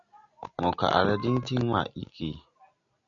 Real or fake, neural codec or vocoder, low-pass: real; none; 7.2 kHz